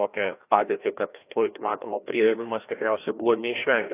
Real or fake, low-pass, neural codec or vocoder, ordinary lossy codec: fake; 3.6 kHz; codec, 16 kHz, 1 kbps, FreqCodec, larger model; AAC, 24 kbps